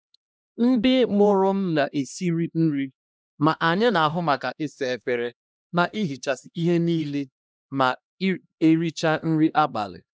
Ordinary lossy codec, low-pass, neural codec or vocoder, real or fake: none; none; codec, 16 kHz, 1 kbps, X-Codec, HuBERT features, trained on LibriSpeech; fake